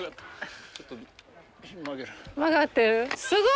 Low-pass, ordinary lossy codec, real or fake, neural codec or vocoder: none; none; real; none